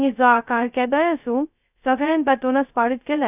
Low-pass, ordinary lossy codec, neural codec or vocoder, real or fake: 3.6 kHz; none; codec, 16 kHz, 0.2 kbps, FocalCodec; fake